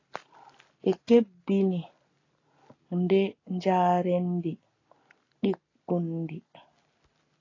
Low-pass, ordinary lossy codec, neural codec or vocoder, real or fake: 7.2 kHz; AAC, 32 kbps; none; real